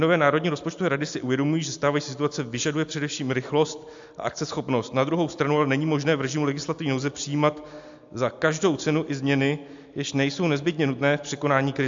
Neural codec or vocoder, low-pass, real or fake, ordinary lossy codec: none; 7.2 kHz; real; AAC, 64 kbps